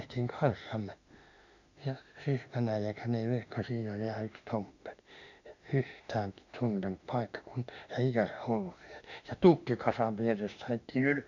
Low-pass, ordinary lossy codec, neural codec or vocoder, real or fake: 7.2 kHz; none; autoencoder, 48 kHz, 32 numbers a frame, DAC-VAE, trained on Japanese speech; fake